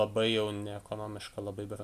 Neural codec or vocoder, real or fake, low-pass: none; real; 14.4 kHz